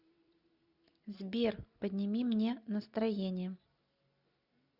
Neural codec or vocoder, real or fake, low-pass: none; real; 5.4 kHz